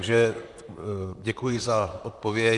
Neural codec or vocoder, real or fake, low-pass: vocoder, 44.1 kHz, 128 mel bands, Pupu-Vocoder; fake; 10.8 kHz